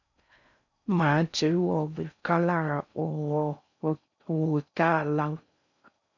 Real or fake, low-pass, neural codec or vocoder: fake; 7.2 kHz; codec, 16 kHz in and 24 kHz out, 0.6 kbps, FocalCodec, streaming, 4096 codes